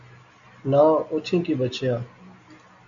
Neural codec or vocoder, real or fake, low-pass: none; real; 7.2 kHz